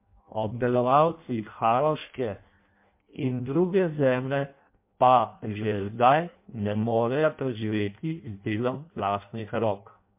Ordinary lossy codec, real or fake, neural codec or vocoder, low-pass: MP3, 32 kbps; fake; codec, 16 kHz in and 24 kHz out, 0.6 kbps, FireRedTTS-2 codec; 3.6 kHz